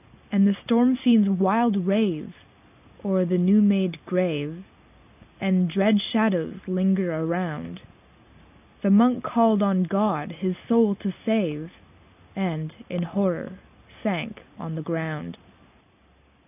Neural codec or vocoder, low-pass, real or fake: none; 3.6 kHz; real